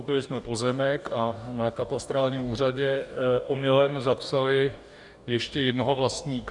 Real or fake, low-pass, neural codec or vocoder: fake; 10.8 kHz; codec, 44.1 kHz, 2.6 kbps, DAC